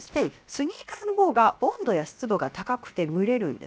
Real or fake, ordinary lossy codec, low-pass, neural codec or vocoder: fake; none; none; codec, 16 kHz, 0.7 kbps, FocalCodec